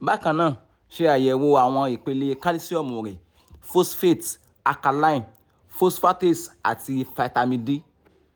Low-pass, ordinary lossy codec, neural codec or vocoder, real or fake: none; none; none; real